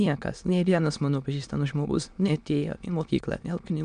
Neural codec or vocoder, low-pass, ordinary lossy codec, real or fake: autoencoder, 22.05 kHz, a latent of 192 numbers a frame, VITS, trained on many speakers; 9.9 kHz; MP3, 96 kbps; fake